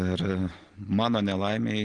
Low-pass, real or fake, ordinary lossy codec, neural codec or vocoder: 9.9 kHz; real; Opus, 16 kbps; none